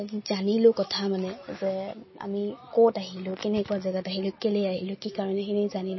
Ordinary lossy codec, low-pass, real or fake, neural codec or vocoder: MP3, 24 kbps; 7.2 kHz; real; none